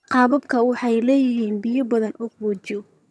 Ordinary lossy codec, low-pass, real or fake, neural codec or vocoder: none; none; fake; vocoder, 22.05 kHz, 80 mel bands, HiFi-GAN